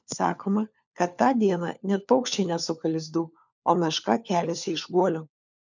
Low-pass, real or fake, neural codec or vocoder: 7.2 kHz; fake; codec, 16 kHz, 4 kbps, FunCodec, trained on LibriTTS, 50 frames a second